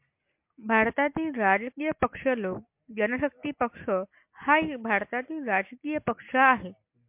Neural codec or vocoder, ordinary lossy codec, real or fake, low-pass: none; MP3, 32 kbps; real; 3.6 kHz